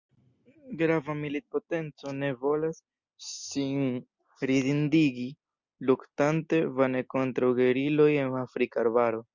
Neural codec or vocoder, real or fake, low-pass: none; real; 7.2 kHz